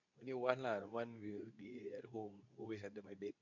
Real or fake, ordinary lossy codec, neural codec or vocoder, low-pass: fake; none; codec, 24 kHz, 0.9 kbps, WavTokenizer, medium speech release version 2; 7.2 kHz